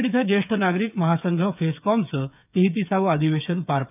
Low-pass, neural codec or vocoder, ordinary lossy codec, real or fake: 3.6 kHz; codec, 16 kHz, 8 kbps, FreqCodec, smaller model; none; fake